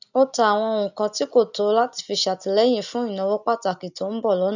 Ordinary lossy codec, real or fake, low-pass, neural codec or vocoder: none; real; 7.2 kHz; none